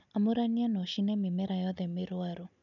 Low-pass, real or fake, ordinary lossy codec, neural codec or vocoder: 7.2 kHz; real; none; none